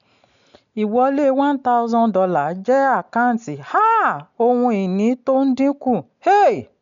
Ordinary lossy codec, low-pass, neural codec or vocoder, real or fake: none; 7.2 kHz; none; real